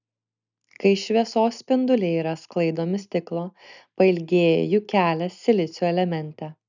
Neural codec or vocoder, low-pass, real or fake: none; 7.2 kHz; real